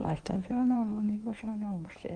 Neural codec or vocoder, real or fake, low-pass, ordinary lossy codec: codec, 16 kHz in and 24 kHz out, 1.1 kbps, FireRedTTS-2 codec; fake; 9.9 kHz; Opus, 24 kbps